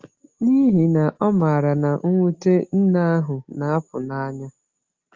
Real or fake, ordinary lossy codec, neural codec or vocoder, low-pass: real; Opus, 32 kbps; none; 7.2 kHz